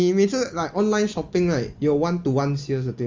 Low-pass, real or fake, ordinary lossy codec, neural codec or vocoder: 7.2 kHz; real; Opus, 32 kbps; none